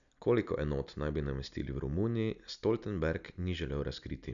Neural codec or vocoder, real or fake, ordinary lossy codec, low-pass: none; real; none; 7.2 kHz